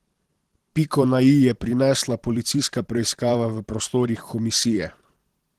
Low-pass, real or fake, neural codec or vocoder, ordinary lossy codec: 14.4 kHz; fake; vocoder, 44.1 kHz, 128 mel bands every 512 samples, BigVGAN v2; Opus, 16 kbps